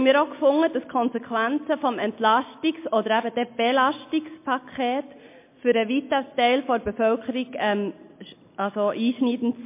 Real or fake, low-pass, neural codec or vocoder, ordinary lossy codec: real; 3.6 kHz; none; MP3, 24 kbps